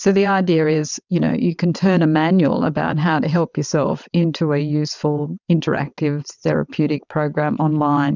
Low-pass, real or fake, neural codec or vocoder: 7.2 kHz; fake; vocoder, 22.05 kHz, 80 mel bands, WaveNeXt